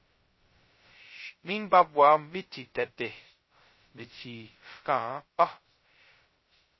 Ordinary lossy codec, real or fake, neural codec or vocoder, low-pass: MP3, 24 kbps; fake; codec, 16 kHz, 0.2 kbps, FocalCodec; 7.2 kHz